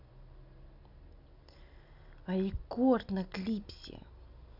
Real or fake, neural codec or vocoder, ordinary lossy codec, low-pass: real; none; none; 5.4 kHz